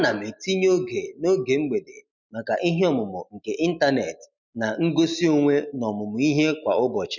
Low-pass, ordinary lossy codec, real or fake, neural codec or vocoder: 7.2 kHz; none; real; none